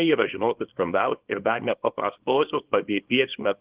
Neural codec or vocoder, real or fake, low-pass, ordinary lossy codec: codec, 24 kHz, 0.9 kbps, WavTokenizer, small release; fake; 3.6 kHz; Opus, 16 kbps